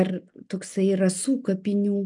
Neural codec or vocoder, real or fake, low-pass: none; real; 10.8 kHz